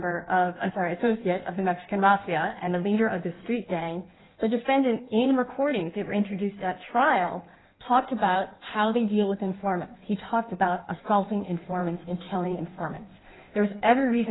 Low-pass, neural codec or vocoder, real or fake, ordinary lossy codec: 7.2 kHz; codec, 16 kHz in and 24 kHz out, 1.1 kbps, FireRedTTS-2 codec; fake; AAC, 16 kbps